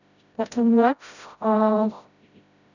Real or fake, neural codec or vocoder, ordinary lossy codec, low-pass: fake; codec, 16 kHz, 0.5 kbps, FreqCodec, smaller model; none; 7.2 kHz